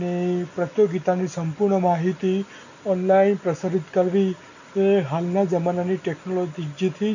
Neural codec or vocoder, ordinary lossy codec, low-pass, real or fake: none; none; 7.2 kHz; real